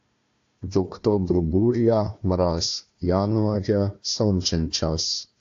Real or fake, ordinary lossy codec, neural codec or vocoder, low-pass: fake; AAC, 48 kbps; codec, 16 kHz, 1 kbps, FunCodec, trained on Chinese and English, 50 frames a second; 7.2 kHz